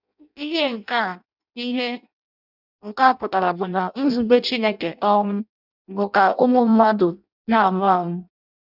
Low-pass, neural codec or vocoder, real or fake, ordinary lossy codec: 5.4 kHz; codec, 16 kHz in and 24 kHz out, 0.6 kbps, FireRedTTS-2 codec; fake; none